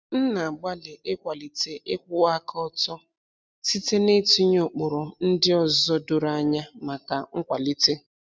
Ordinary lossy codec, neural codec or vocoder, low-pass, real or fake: none; none; none; real